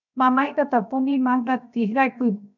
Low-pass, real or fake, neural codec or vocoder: 7.2 kHz; fake; codec, 16 kHz, 0.7 kbps, FocalCodec